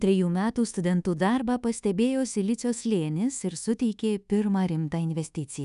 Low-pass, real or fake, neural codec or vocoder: 10.8 kHz; fake; codec, 24 kHz, 1.2 kbps, DualCodec